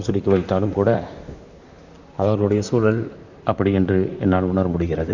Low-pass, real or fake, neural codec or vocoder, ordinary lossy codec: 7.2 kHz; fake; vocoder, 44.1 kHz, 128 mel bands, Pupu-Vocoder; none